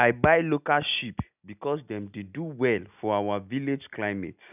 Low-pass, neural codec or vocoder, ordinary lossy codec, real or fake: 3.6 kHz; none; none; real